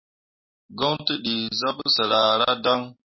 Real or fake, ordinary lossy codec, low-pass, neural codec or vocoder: real; MP3, 24 kbps; 7.2 kHz; none